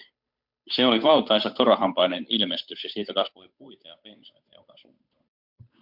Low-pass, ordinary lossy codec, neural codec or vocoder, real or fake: 5.4 kHz; MP3, 48 kbps; codec, 16 kHz, 8 kbps, FunCodec, trained on Chinese and English, 25 frames a second; fake